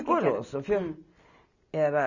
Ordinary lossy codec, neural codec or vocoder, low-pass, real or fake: none; none; 7.2 kHz; real